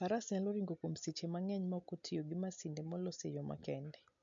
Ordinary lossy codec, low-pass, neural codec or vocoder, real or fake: MP3, 48 kbps; 7.2 kHz; none; real